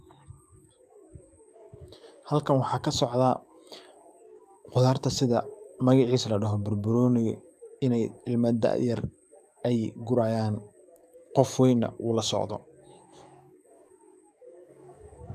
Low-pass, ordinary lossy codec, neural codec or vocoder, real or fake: 14.4 kHz; none; codec, 44.1 kHz, 7.8 kbps, DAC; fake